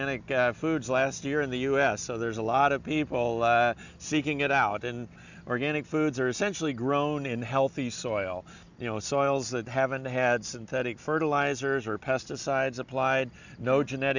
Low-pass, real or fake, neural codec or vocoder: 7.2 kHz; real; none